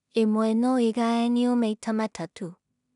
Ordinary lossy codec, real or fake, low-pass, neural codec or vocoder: none; fake; 10.8 kHz; codec, 16 kHz in and 24 kHz out, 0.4 kbps, LongCat-Audio-Codec, two codebook decoder